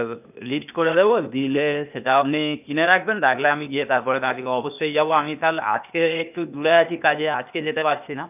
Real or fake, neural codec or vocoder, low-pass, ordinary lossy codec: fake; codec, 16 kHz, 0.8 kbps, ZipCodec; 3.6 kHz; none